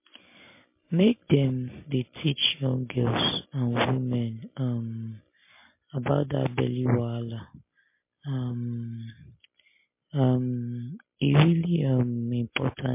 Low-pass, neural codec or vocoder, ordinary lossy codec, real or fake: 3.6 kHz; none; MP3, 24 kbps; real